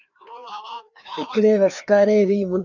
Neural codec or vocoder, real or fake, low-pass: codec, 16 kHz, 2 kbps, FreqCodec, larger model; fake; 7.2 kHz